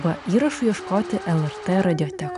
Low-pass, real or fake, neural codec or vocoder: 10.8 kHz; real; none